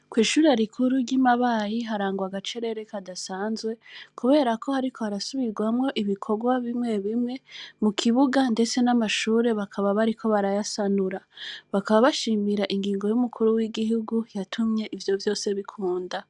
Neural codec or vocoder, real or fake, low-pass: none; real; 10.8 kHz